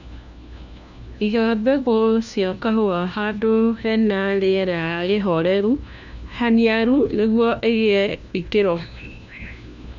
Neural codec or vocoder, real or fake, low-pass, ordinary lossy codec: codec, 16 kHz, 1 kbps, FunCodec, trained on LibriTTS, 50 frames a second; fake; 7.2 kHz; none